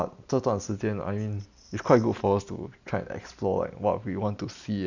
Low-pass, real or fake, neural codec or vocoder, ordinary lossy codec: 7.2 kHz; real; none; none